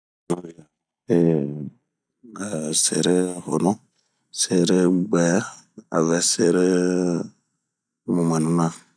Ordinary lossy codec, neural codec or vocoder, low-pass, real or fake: none; none; 9.9 kHz; real